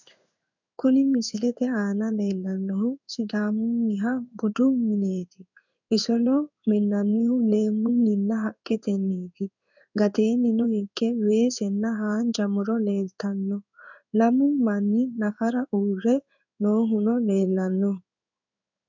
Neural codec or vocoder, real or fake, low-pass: codec, 16 kHz in and 24 kHz out, 1 kbps, XY-Tokenizer; fake; 7.2 kHz